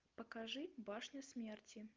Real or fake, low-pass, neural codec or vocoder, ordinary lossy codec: real; 7.2 kHz; none; Opus, 24 kbps